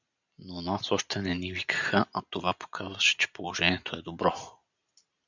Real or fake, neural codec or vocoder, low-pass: real; none; 7.2 kHz